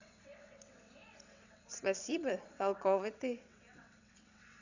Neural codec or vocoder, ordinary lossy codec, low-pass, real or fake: vocoder, 22.05 kHz, 80 mel bands, WaveNeXt; none; 7.2 kHz; fake